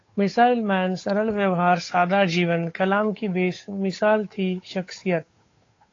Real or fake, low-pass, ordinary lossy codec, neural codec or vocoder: fake; 7.2 kHz; AAC, 32 kbps; codec, 16 kHz, 8 kbps, FunCodec, trained on Chinese and English, 25 frames a second